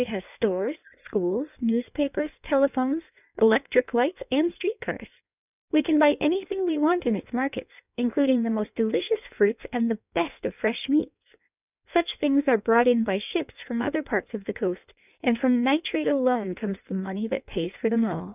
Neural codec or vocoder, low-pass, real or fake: codec, 16 kHz in and 24 kHz out, 1.1 kbps, FireRedTTS-2 codec; 3.6 kHz; fake